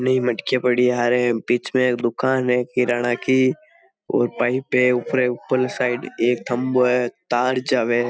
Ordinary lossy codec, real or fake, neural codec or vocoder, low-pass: none; real; none; none